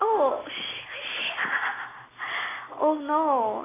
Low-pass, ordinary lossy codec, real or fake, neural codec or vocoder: 3.6 kHz; AAC, 16 kbps; real; none